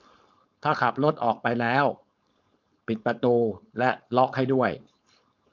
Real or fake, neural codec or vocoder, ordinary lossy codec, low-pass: fake; codec, 16 kHz, 4.8 kbps, FACodec; none; 7.2 kHz